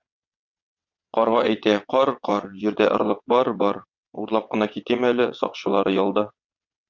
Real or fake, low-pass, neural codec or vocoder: fake; 7.2 kHz; vocoder, 22.05 kHz, 80 mel bands, WaveNeXt